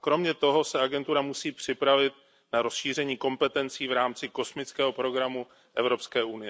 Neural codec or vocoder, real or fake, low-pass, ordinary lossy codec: none; real; none; none